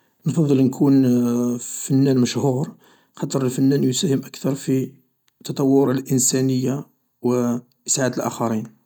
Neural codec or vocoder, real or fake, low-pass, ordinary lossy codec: none; real; 19.8 kHz; none